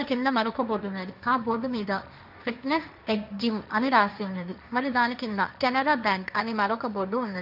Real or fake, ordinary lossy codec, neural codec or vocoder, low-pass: fake; none; codec, 16 kHz, 1.1 kbps, Voila-Tokenizer; 5.4 kHz